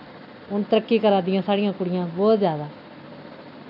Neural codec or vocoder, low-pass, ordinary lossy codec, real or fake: none; 5.4 kHz; none; real